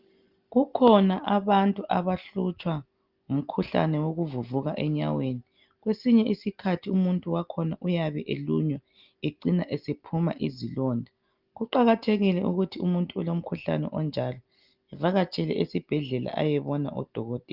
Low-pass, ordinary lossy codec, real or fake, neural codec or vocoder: 5.4 kHz; Opus, 24 kbps; real; none